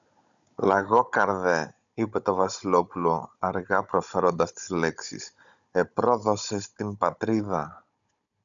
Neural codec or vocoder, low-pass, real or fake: codec, 16 kHz, 16 kbps, FunCodec, trained on Chinese and English, 50 frames a second; 7.2 kHz; fake